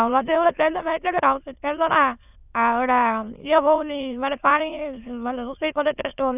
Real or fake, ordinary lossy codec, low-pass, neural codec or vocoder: fake; none; 3.6 kHz; autoencoder, 22.05 kHz, a latent of 192 numbers a frame, VITS, trained on many speakers